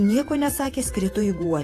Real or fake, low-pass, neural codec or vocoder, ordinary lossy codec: fake; 14.4 kHz; vocoder, 48 kHz, 128 mel bands, Vocos; AAC, 48 kbps